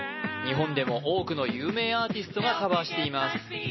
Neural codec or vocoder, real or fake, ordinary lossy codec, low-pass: none; real; MP3, 24 kbps; 7.2 kHz